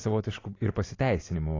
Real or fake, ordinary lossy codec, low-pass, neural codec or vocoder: real; AAC, 32 kbps; 7.2 kHz; none